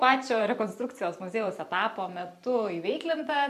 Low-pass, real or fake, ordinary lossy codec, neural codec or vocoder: 14.4 kHz; fake; AAC, 96 kbps; vocoder, 44.1 kHz, 128 mel bands every 512 samples, BigVGAN v2